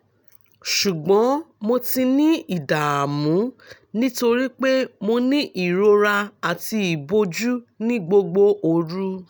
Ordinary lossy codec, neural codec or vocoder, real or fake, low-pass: none; none; real; none